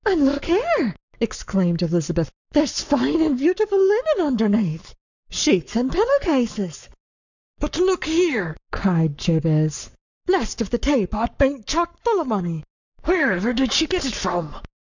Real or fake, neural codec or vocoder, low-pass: fake; codec, 44.1 kHz, 7.8 kbps, Pupu-Codec; 7.2 kHz